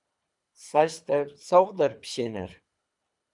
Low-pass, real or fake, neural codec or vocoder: 10.8 kHz; fake; codec, 24 kHz, 3 kbps, HILCodec